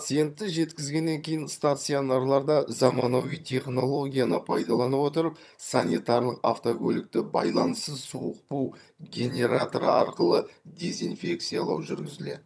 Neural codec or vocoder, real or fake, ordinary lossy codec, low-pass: vocoder, 22.05 kHz, 80 mel bands, HiFi-GAN; fake; none; none